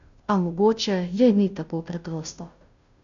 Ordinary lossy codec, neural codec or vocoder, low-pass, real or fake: none; codec, 16 kHz, 0.5 kbps, FunCodec, trained on Chinese and English, 25 frames a second; 7.2 kHz; fake